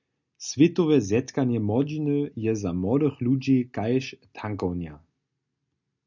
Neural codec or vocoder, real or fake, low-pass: none; real; 7.2 kHz